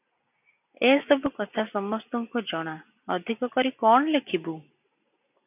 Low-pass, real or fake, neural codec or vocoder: 3.6 kHz; real; none